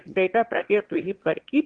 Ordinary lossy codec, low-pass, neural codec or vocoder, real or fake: Opus, 16 kbps; 9.9 kHz; autoencoder, 22.05 kHz, a latent of 192 numbers a frame, VITS, trained on one speaker; fake